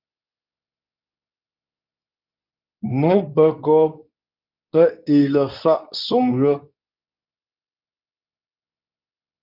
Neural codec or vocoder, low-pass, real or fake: codec, 24 kHz, 0.9 kbps, WavTokenizer, medium speech release version 2; 5.4 kHz; fake